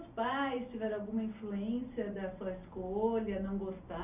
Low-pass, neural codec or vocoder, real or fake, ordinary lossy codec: 3.6 kHz; none; real; none